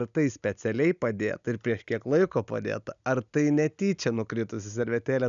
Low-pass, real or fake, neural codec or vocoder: 7.2 kHz; real; none